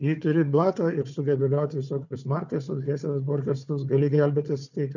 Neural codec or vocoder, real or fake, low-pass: codec, 16 kHz, 4 kbps, FunCodec, trained on Chinese and English, 50 frames a second; fake; 7.2 kHz